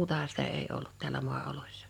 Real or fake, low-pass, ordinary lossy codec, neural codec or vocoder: fake; 19.8 kHz; none; vocoder, 44.1 kHz, 128 mel bands every 512 samples, BigVGAN v2